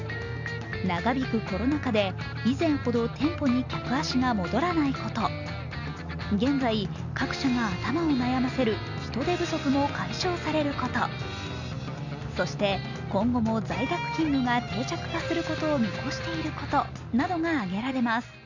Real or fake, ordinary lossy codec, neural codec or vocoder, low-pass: real; none; none; 7.2 kHz